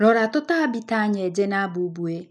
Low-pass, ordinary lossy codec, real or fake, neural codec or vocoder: none; none; real; none